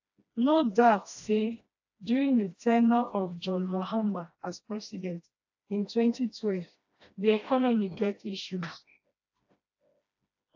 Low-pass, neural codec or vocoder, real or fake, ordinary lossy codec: 7.2 kHz; codec, 16 kHz, 1 kbps, FreqCodec, smaller model; fake; AAC, 48 kbps